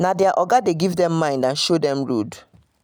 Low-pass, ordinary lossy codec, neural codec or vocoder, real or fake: none; none; none; real